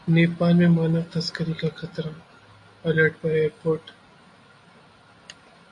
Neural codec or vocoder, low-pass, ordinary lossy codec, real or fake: none; 10.8 kHz; AAC, 48 kbps; real